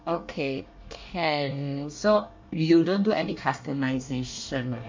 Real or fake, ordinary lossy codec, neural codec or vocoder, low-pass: fake; MP3, 48 kbps; codec, 24 kHz, 1 kbps, SNAC; 7.2 kHz